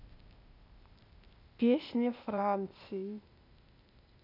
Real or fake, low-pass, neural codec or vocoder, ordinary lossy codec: fake; 5.4 kHz; codec, 16 kHz, 0.8 kbps, ZipCodec; none